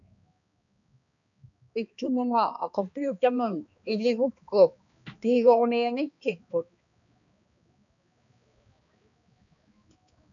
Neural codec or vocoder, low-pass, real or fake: codec, 16 kHz, 2 kbps, X-Codec, HuBERT features, trained on balanced general audio; 7.2 kHz; fake